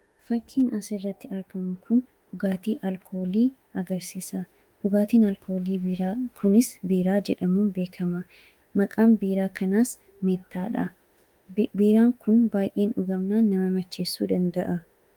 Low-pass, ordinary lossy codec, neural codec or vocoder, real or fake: 19.8 kHz; Opus, 32 kbps; autoencoder, 48 kHz, 32 numbers a frame, DAC-VAE, trained on Japanese speech; fake